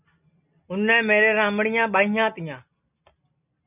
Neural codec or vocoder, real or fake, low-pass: none; real; 3.6 kHz